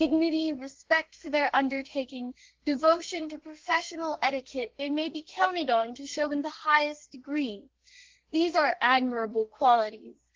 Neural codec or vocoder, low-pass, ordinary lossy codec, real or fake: codec, 32 kHz, 1.9 kbps, SNAC; 7.2 kHz; Opus, 16 kbps; fake